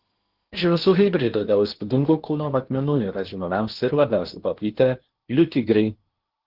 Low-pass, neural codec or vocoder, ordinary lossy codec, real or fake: 5.4 kHz; codec, 16 kHz in and 24 kHz out, 0.8 kbps, FocalCodec, streaming, 65536 codes; Opus, 16 kbps; fake